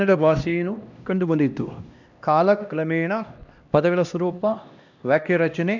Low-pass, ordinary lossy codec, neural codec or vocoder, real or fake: 7.2 kHz; none; codec, 16 kHz, 1 kbps, X-Codec, HuBERT features, trained on LibriSpeech; fake